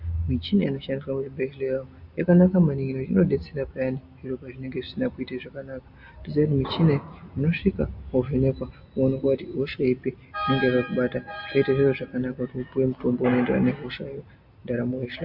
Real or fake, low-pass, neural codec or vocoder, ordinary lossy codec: real; 5.4 kHz; none; AAC, 48 kbps